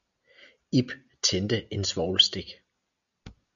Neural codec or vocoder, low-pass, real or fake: none; 7.2 kHz; real